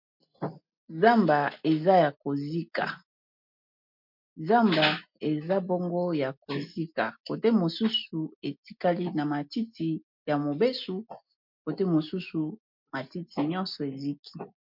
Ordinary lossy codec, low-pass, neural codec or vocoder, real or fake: MP3, 48 kbps; 5.4 kHz; none; real